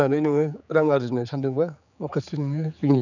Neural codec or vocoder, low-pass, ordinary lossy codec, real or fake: codec, 16 kHz, 4 kbps, X-Codec, HuBERT features, trained on general audio; 7.2 kHz; none; fake